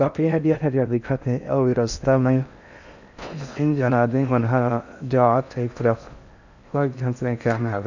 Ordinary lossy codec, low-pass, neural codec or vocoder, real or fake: none; 7.2 kHz; codec, 16 kHz in and 24 kHz out, 0.6 kbps, FocalCodec, streaming, 4096 codes; fake